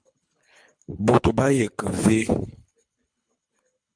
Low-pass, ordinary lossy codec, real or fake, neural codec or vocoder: 9.9 kHz; MP3, 96 kbps; fake; codec, 24 kHz, 3 kbps, HILCodec